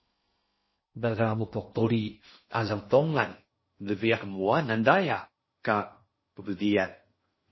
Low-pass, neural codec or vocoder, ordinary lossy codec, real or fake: 7.2 kHz; codec, 16 kHz in and 24 kHz out, 0.6 kbps, FocalCodec, streaming, 4096 codes; MP3, 24 kbps; fake